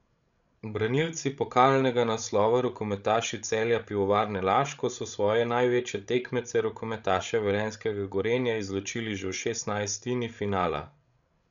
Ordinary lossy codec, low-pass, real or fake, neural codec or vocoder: none; 7.2 kHz; fake; codec, 16 kHz, 16 kbps, FreqCodec, larger model